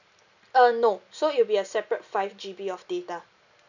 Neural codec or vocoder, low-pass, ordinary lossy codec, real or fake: none; 7.2 kHz; none; real